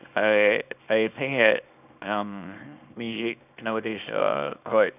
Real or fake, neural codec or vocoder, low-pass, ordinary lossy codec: fake; codec, 24 kHz, 0.9 kbps, WavTokenizer, small release; 3.6 kHz; none